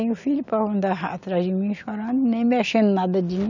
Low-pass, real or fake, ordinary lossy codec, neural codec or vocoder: 7.2 kHz; real; none; none